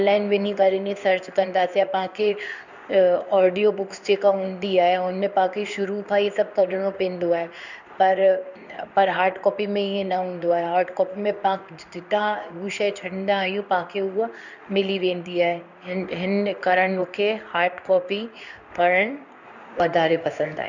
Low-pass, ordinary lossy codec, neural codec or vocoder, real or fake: 7.2 kHz; none; codec, 16 kHz in and 24 kHz out, 1 kbps, XY-Tokenizer; fake